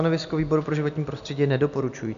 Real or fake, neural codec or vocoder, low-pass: real; none; 7.2 kHz